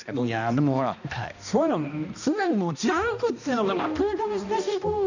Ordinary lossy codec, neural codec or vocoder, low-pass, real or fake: none; codec, 16 kHz, 1 kbps, X-Codec, HuBERT features, trained on balanced general audio; 7.2 kHz; fake